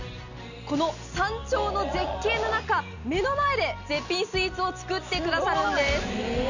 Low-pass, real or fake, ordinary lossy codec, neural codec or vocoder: 7.2 kHz; real; none; none